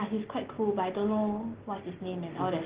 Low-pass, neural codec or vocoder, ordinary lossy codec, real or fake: 3.6 kHz; none; Opus, 16 kbps; real